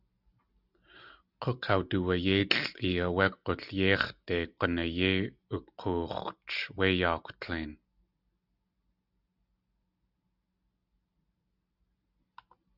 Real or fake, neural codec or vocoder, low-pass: real; none; 5.4 kHz